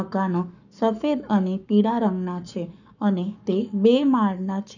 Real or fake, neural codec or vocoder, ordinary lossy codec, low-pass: fake; codec, 44.1 kHz, 7.8 kbps, Pupu-Codec; none; 7.2 kHz